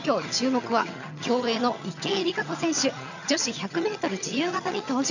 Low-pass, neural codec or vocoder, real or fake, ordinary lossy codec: 7.2 kHz; vocoder, 22.05 kHz, 80 mel bands, HiFi-GAN; fake; none